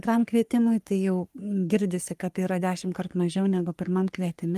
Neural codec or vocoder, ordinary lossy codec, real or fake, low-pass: codec, 44.1 kHz, 3.4 kbps, Pupu-Codec; Opus, 32 kbps; fake; 14.4 kHz